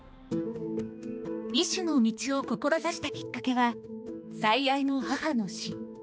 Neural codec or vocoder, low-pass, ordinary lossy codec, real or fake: codec, 16 kHz, 2 kbps, X-Codec, HuBERT features, trained on balanced general audio; none; none; fake